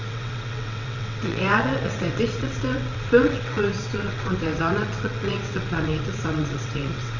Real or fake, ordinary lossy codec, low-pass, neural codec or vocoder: fake; none; 7.2 kHz; vocoder, 22.05 kHz, 80 mel bands, WaveNeXt